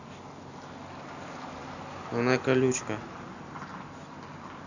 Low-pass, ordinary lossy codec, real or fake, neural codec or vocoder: 7.2 kHz; none; real; none